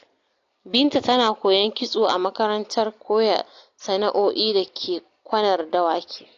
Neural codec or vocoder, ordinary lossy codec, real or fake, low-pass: none; AAC, 48 kbps; real; 7.2 kHz